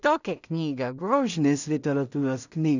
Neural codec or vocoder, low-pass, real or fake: codec, 16 kHz in and 24 kHz out, 0.4 kbps, LongCat-Audio-Codec, two codebook decoder; 7.2 kHz; fake